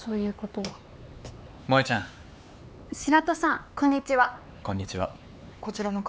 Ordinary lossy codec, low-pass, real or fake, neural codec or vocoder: none; none; fake; codec, 16 kHz, 4 kbps, X-Codec, HuBERT features, trained on LibriSpeech